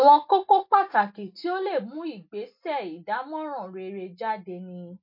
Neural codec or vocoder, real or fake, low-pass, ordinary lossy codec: none; real; 5.4 kHz; MP3, 32 kbps